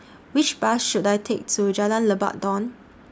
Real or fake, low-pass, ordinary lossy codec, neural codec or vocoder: real; none; none; none